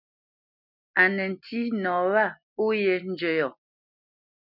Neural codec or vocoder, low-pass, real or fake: none; 5.4 kHz; real